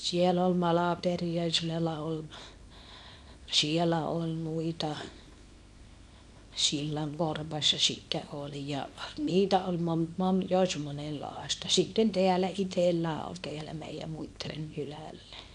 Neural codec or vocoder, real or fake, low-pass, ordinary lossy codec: codec, 24 kHz, 0.9 kbps, WavTokenizer, small release; fake; none; none